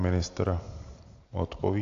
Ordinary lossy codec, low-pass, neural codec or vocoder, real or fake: AAC, 48 kbps; 7.2 kHz; none; real